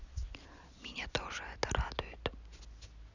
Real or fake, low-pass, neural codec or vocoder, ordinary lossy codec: real; 7.2 kHz; none; none